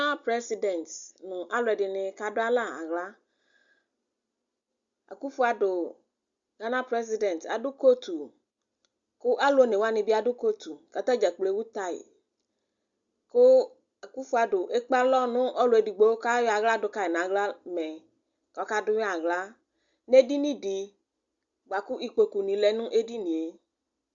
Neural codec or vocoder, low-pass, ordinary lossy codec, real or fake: none; 7.2 kHz; Opus, 64 kbps; real